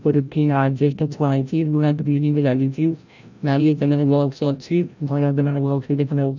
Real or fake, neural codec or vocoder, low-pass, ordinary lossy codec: fake; codec, 16 kHz, 0.5 kbps, FreqCodec, larger model; 7.2 kHz; none